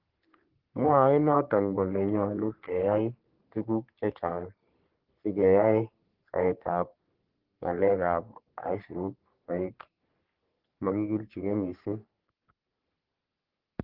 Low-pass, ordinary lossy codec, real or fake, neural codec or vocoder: 5.4 kHz; Opus, 16 kbps; fake; codec, 44.1 kHz, 3.4 kbps, Pupu-Codec